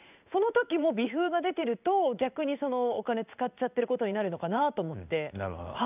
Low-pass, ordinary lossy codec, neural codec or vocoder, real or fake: 3.6 kHz; none; codec, 16 kHz in and 24 kHz out, 1 kbps, XY-Tokenizer; fake